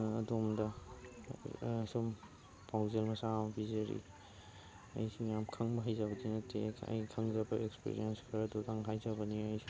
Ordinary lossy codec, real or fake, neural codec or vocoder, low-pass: none; real; none; none